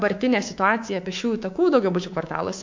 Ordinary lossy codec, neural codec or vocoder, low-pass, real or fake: MP3, 48 kbps; codec, 24 kHz, 3.1 kbps, DualCodec; 7.2 kHz; fake